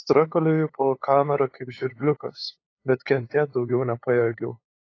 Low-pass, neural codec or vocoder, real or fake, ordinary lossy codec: 7.2 kHz; codec, 16 kHz, 8 kbps, FunCodec, trained on LibriTTS, 25 frames a second; fake; AAC, 32 kbps